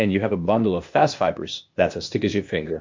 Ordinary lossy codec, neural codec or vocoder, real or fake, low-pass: MP3, 48 kbps; codec, 16 kHz, 0.8 kbps, ZipCodec; fake; 7.2 kHz